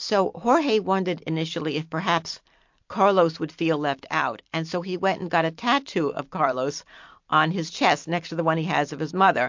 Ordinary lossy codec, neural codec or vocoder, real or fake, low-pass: MP3, 48 kbps; vocoder, 44.1 kHz, 128 mel bands every 256 samples, BigVGAN v2; fake; 7.2 kHz